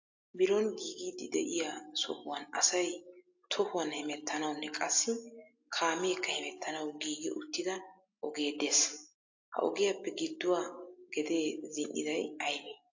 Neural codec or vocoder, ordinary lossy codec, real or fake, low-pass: none; AAC, 48 kbps; real; 7.2 kHz